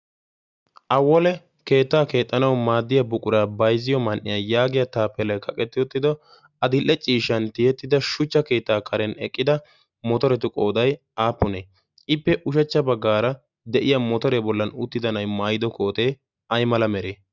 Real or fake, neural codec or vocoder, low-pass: real; none; 7.2 kHz